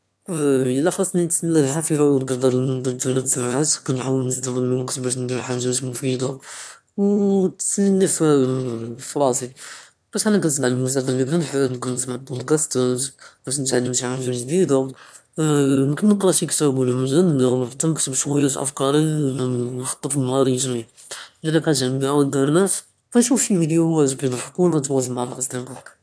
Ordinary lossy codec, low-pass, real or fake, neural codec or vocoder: none; none; fake; autoencoder, 22.05 kHz, a latent of 192 numbers a frame, VITS, trained on one speaker